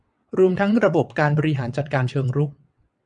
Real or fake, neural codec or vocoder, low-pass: fake; vocoder, 22.05 kHz, 80 mel bands, WaveNeXt; 9.9 kHz